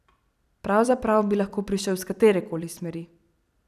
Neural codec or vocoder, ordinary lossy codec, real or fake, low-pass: vocoder, 44.1 kHz, 128 mel bands every 256 samples, BigVGAN v2; none; fake; 14.4 kHz